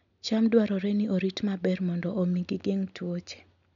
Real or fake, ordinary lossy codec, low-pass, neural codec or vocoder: real; none; 7.2 kHz; none